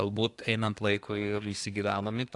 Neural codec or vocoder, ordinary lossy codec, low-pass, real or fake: codec, 24 kHz, 1 kbps, SNAC; AAC, 48 kbps; 10.8 kHz; fake